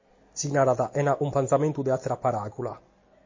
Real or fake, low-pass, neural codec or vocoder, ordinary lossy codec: real; 7.2 kHz; none; MP3, 32 kbps